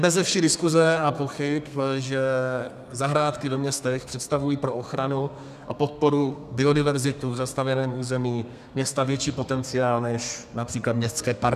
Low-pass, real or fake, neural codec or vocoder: 14.4 kHz; fake; codec, 32 kHz, 1.9 kbps, SNAC